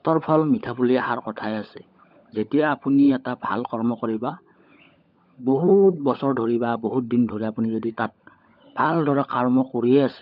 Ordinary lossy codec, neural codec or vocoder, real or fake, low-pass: none; codec, 16 kHz, 16 kbps, FunCodec, trained on LibriTTS, 50 frames a second; fake; 5.4 kHz